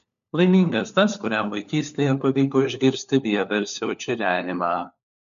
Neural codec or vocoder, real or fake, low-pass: codec, 16 kHz, 4 kbps, FunCodec, trained on LibriTTS, 50 frames a second; fake; 7.2 kHz